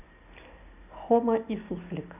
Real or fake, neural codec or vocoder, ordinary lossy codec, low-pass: real; none; none; 3.6 kHz